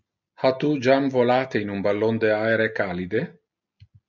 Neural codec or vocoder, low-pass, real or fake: none; 7.2 kHz; real